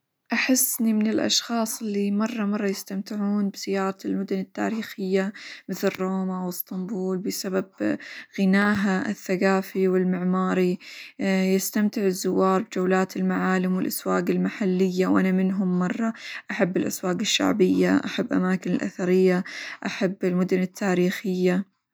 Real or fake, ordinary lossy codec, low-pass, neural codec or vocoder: real; none; none; none